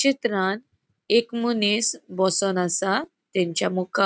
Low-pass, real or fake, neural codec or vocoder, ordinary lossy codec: none; real; none; none